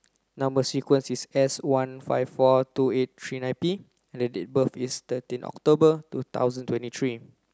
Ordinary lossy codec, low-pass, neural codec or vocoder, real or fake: none; none; none; real